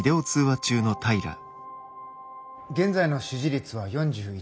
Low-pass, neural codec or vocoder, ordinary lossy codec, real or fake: none; none; none; real